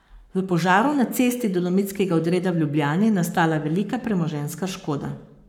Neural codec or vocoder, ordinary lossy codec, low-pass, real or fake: codec, 44.1 kHz, 7.8 kbps, Pupu-Codec; none; 19.8 kHz; fake